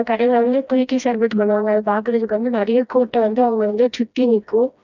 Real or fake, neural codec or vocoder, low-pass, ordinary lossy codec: fake; codec, 16 kHz, 1 kbps, FreqCodec, smaller model; 7.2 kHz; none